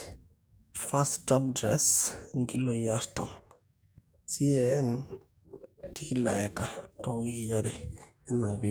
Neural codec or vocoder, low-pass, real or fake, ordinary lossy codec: codec, 44.1 kHz, 2.6 kbps, DAC; none; fake; none